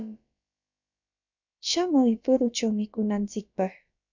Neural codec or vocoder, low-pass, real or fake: codec, 16 kHz, about 1 kbps, DyCAST, with the encoder's durations; 7.2 kHz; fake